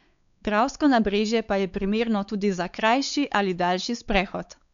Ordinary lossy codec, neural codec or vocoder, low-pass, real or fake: none; codec, 16 kHz, 4 kbps, X-Codec, WavLM features, trained on Multilingual LibriSpeech; 7.2 kHz; fake